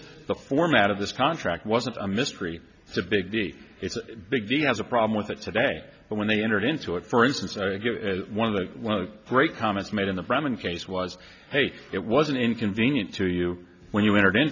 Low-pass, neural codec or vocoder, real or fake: 7.2 kHz; none; real